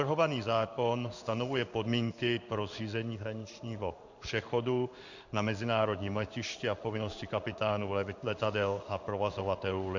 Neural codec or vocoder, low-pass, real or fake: codec, 16 kHz in and 24 kHz out, 1 kbps, XY-Tokenizer; 7.2 kHz; fake